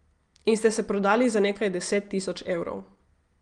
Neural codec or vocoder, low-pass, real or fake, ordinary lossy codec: none; 9.9 kHz; real; Opus, 24 kbps